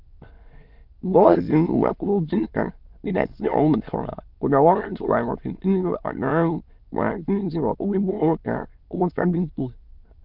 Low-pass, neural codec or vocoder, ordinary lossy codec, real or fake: 5.4 kHz; autoencoder, 22.05 kHz, a latent of 192 numbers a frame, VITS, trained on many speakers; Opus, 32 kbps; fake